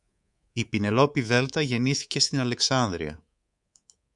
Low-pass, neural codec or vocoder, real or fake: 10.8 kHz; codec, 24 kHz, 3.1 kbps, DualCodec; fake